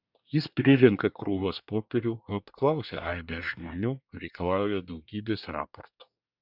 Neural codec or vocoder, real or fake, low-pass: codec, 24 kHz, 1 kbps, SNAC; fake; 5.4 kHz